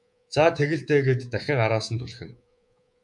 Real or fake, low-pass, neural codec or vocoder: fake; 10.8 kHz; codec, 24 kHz, 3.1 kbps, DualCodec